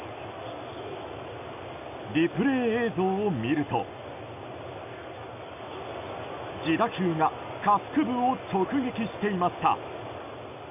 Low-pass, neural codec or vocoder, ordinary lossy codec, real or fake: 3.6 kHz; none; none; real